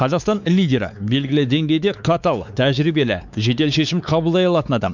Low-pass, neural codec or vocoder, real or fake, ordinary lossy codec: 7.2 kHz; codec, 16 kHz, 4 kbps, X-Codec, WavLM features, trained on Multilingual LibriSpeech; fake; none